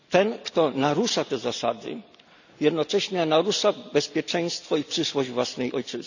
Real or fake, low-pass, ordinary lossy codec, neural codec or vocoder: real; 7.2 kHz; none; none